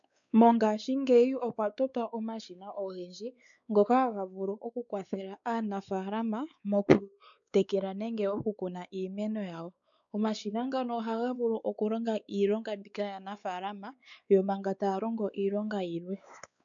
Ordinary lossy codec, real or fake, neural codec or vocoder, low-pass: MP3, 96 kbps; fake; codec, 16 kHz, 4 kbps, X-Codec, WavLM features, trained on Multilingual LibriSpeech; 7.2 kHz